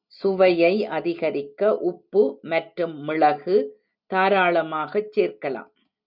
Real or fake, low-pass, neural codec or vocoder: real; 5.4 kHz; none